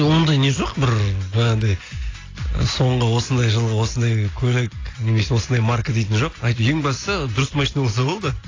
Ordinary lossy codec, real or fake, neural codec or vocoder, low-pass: AAC, 32 kbps; real; none; 7.2 kHz